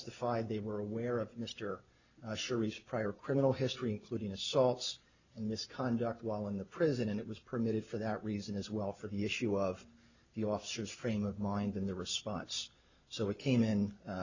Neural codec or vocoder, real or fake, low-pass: none; real; 7.2 kHz